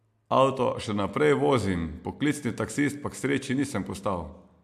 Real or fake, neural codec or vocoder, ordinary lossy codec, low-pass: real; none; MP3, 96 kbps; 14.4 kHz